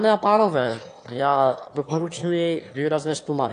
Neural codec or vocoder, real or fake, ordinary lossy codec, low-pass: autoencoder, 22.05 kHz, a latent of 192 numbers a frame, VITS, trained on one speaker; fake; AAC, 48 kbps; 9.9 kHz